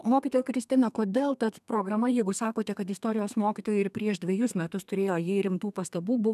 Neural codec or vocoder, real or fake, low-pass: codec, 32 kHz, 1.9 kbps, SNAC; fake; 14.4 kHz